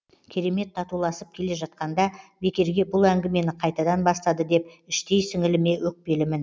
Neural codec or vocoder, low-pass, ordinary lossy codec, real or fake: none; none; none; real